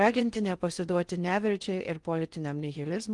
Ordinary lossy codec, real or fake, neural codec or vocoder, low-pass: Opus, 24 kbps; fake; codec, 16 kHz in and 24 kHz out, 0.6 kbps, FocalCodec, streaming, 4096 codes; 10.8 kHz